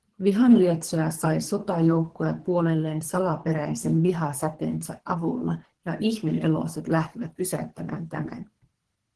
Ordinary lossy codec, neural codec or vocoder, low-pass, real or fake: Opus, 16 kbps; codec, 24 kHz, 3 kbps, HILCodec; 10.8 kHz; fake